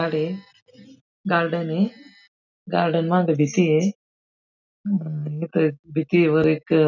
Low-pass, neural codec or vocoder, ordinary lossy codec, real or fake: 7.2 kHz; none; none; real